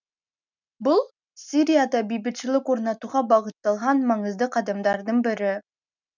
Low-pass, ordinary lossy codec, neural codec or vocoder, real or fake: 7.2 kHz; none; none; real